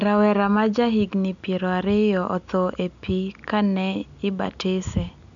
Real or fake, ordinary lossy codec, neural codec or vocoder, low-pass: real; none; none; 7.2 kHz